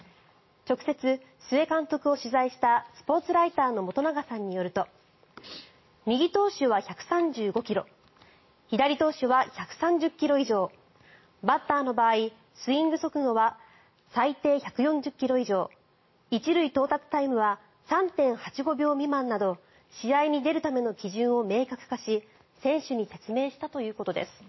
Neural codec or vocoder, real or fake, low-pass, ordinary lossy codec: none; real; 7.2 kHz; MP3, 24 kbps